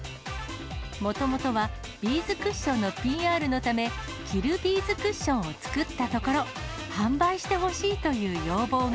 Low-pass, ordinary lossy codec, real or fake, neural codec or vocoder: none; none; real; none